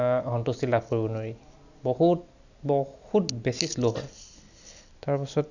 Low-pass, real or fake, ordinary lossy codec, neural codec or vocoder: 7.2 kHz; real; none; none